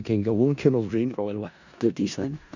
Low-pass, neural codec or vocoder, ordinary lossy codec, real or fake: 7.2 kHz; codec, 16 kHz in and 24 kHz out, 0.4 kbps, LongCat-Audio-Codec, four codebook decoder; none; fake